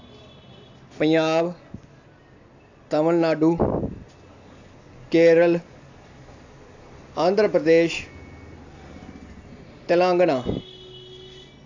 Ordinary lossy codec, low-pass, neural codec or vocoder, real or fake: AAC, 48 kbps; 7.2 kHz; none; real